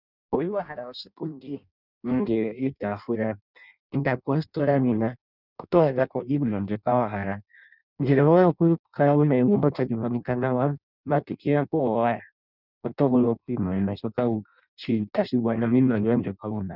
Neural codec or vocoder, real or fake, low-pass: codec, 16 kHz in and 24 kHz out, 0.6 kbps, FireRedTTS-2 codec; fake; 5.4 kHz